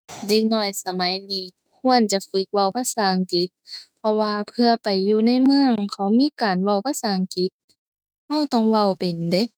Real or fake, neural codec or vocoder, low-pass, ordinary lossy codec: fake; autoencoder, 48 kHz, 32 numbers a frame, DAC-VAE, trained on Japanese speech; none; none